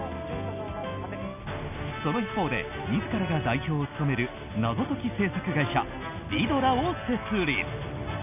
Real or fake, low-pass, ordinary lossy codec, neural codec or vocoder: real; 3.6 kHz; none; none